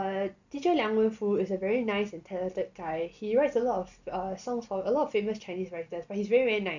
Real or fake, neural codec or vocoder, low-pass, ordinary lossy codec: real; none; 7.2 kHz; none